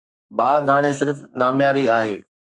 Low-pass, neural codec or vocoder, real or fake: 10.8 kHz; codec, 32 kHz, 1.9 kbps, SNAC; fake